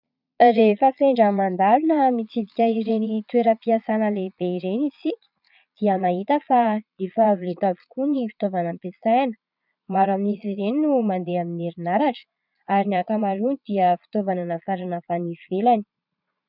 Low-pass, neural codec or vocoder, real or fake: 5.4 kHz; vocoder, 22.05 kHz, 80 mel bands, Vocos; fake